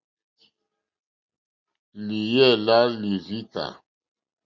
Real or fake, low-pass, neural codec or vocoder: real; 7.2 kHz; none